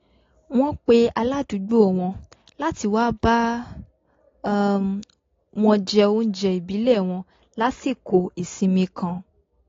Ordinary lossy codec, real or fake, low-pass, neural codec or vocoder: AAC, 32 kbps; real; 7.2 kHz; none